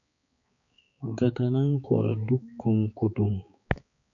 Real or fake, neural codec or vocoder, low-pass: fake; codec, 16 kHz, 4 kbps, X-Codec, HuBERT features, trained on balanced general audio; 7.2 kHz